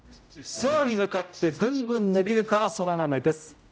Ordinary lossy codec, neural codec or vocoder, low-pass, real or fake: none; codec, 16 kHz, 0.5 kbps, X-Codec, HuBERT features, trained on general audio; none; fake